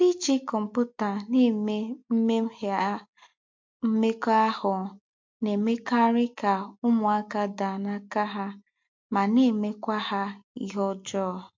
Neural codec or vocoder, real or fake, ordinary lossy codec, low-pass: none; real; MP3, 48 kbps; 7.2 kHz